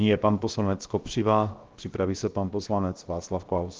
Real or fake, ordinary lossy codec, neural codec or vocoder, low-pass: fake; Opus, 16 kbps; codec, 16 kHz, 0.7 kbps, FocalCodec; 7.2 kHz